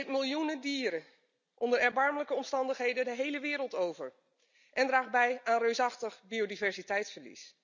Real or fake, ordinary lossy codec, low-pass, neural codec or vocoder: real; none; 7.2 kHz; none